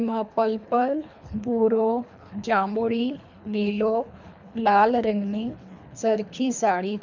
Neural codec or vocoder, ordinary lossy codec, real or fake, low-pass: codec, 24 kHz, 3 kbps, HILCodec; none; fake; 7.2 kHz